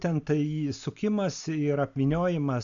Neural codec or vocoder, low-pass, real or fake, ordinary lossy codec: none; 7.2 kHz; real; AAC, 64 kbps